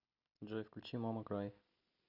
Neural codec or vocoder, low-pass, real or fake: none; 5.4 kHz; real